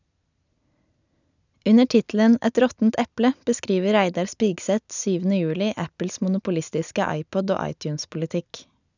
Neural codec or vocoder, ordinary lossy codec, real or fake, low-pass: none; none; real; 7.2 kHz